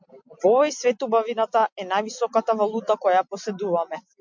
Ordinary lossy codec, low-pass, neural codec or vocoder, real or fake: MP3, 64 kbps; 7.2 kHz; none; real